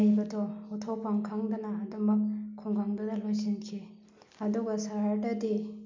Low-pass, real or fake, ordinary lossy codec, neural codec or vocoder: 7.2 kHz; real; MP3, 64 kbps; none